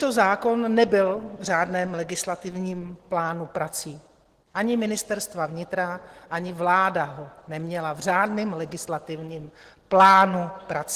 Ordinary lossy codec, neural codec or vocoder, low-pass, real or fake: Opus, 16 kbps; none; 14.4 kHz; real